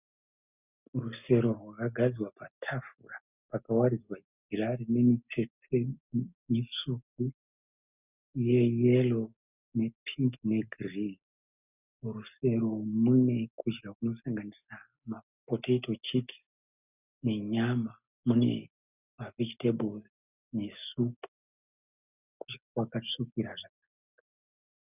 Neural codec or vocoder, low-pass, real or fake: none; 3.6 kHz; real